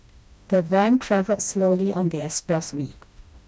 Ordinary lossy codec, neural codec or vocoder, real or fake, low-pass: none; codec, 16 kHz, 1 kbps, FreqCodec, smaller model; fake; none